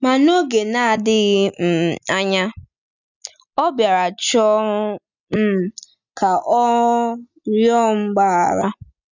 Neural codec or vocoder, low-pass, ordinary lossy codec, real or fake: none; 7.2 kHz; none; real